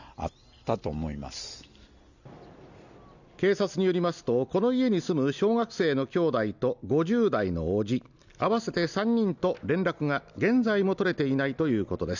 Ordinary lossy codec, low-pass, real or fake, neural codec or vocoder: none; 7.2 kHz; real; none